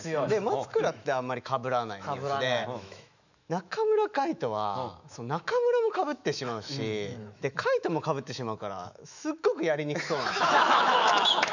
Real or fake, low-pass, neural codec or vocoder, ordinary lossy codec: fake; 7.2 kHz; autoencoder, 48 kHz, 128 numbers a frame, DAC-VAE, trained on Japanese speech; none